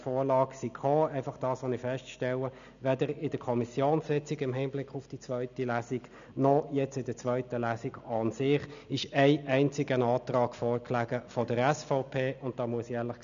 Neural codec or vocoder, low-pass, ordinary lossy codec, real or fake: none; 7.2 kHz; none; real